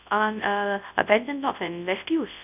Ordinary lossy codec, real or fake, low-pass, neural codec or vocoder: none; fake; 3.6 kHz; codec, 24 kHz, 0.9 kbps, WavTokenizer, large speech release